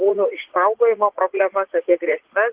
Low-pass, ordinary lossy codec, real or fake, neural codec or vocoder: 3.6 kHz; Opus, 16 kbps; fake; vocoder, 44.1 kHz, 80 mel bands, Vocos